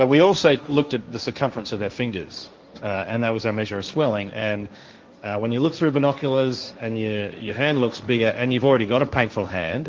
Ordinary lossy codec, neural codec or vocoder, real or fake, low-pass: Opus, 32 kbps; codec, 16 kHz, 1.1 kbps, Voila-Tokenizer; fake; 7.2 kHz